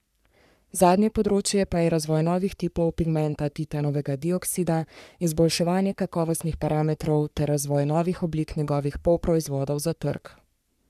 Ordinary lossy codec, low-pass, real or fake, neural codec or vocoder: none; 14.4 kHz; fake; codec, 44.1 kHz, 3.4 kbps, Pupu-Codec